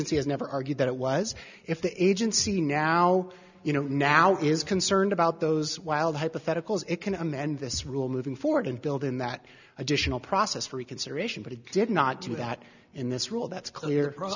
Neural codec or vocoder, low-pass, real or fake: none; 7.2 kHz; real